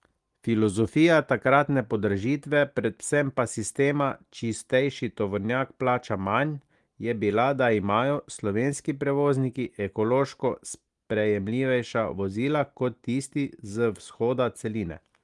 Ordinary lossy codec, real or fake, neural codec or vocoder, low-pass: Opus, 24 kbps; real; none; 10.8 kHz